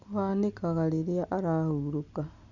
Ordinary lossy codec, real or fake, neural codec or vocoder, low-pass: none; real; none; 7.2 kHz